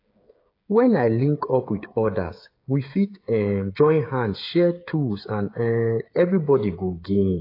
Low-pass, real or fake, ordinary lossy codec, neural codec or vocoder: 5.4 kHz; fake; AAC, 32 kbps; codec, 16 kHz, 8 kbps, FreqCodec, smaller model